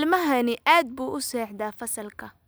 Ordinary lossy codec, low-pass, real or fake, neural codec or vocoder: none; none; real; none